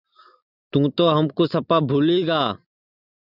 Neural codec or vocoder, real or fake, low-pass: none; real; 5.4 kHz